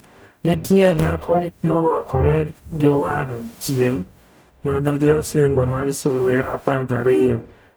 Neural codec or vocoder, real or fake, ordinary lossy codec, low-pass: codec, 44.1 kHz, 0.9 kbps, DAC; fake; none; none